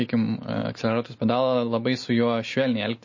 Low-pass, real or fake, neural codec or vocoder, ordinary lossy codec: 7.2 kHz; real; none; MP3, 32 kbps